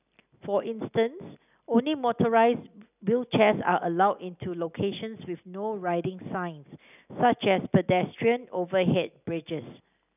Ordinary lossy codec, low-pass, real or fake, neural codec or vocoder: none; 3.6 kHz; real; none